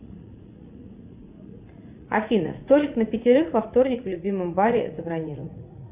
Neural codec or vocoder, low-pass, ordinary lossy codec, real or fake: vocoder, 44.1 kHz, 80 mel bands, Vocos; 3.6 kHz; Opus, 64 kbps; fake